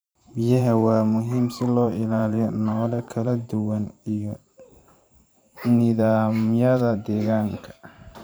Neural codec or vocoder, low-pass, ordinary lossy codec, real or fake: vocoder, 44.1 kHz, 128 mel bands every 512 samples, BigVGAN v2; none; none; fake